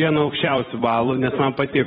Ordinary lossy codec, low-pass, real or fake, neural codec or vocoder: AAC, 16 kbps; 19.8 kHz; fake; vocoder, 44.1 kHz, 128 mel bands every 256 samples, BigVGAN v2